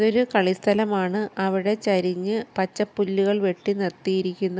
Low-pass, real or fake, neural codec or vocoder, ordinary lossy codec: none; real; none; none